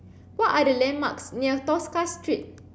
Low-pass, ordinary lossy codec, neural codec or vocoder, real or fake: none; none; none; real